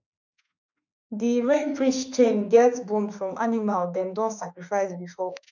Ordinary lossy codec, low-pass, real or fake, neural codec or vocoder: none; 7.2 kHz; fake; autoencoder, 48 kHz, 32 numbers a frame, DAC-VAE, trained on Japanese speech